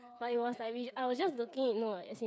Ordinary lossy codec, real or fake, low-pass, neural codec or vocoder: none; fake; none; codec, 16 kHz, 8 kbps, FreqCodec, smaller model